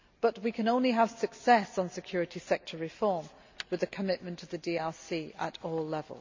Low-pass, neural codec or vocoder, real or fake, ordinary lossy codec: 7.2 kHz; none; real; MP3, 64 kbps